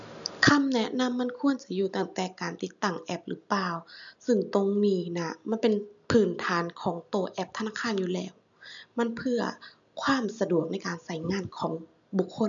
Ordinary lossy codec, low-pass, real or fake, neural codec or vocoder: none; 7.2 kHz; real; none